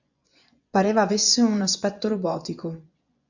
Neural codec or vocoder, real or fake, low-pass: vocoder, 24 kHz, 100 mel bands, Vocos; fake; 7.2 kHz